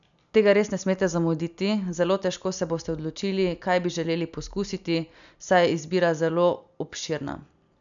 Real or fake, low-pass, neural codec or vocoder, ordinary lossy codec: real; 7.2 kHz; none; none